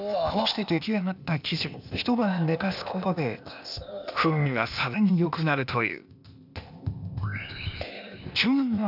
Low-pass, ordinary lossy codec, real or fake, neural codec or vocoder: 5.4 kHz; none; fake; codec, 16 kHz, 0.8 kbps, ZipCodec